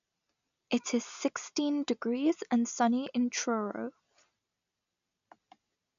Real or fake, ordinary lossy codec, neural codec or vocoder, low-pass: real; none; none; 7.2 kHz